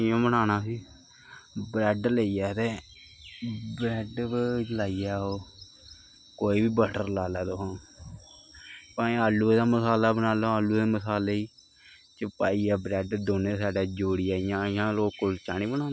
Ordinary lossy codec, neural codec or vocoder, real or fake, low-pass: none; none; real; none